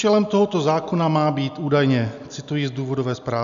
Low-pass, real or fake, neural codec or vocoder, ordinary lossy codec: 7.2 kHz; real; none; AAC, 96 kbps